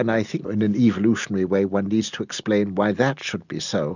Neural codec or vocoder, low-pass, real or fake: none; 7.2 kHz; real